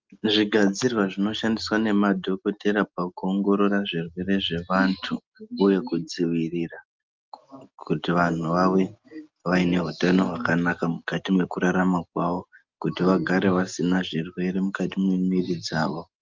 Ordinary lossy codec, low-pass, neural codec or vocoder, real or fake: Opus, 32 kbps; 7.2 kHz; none; real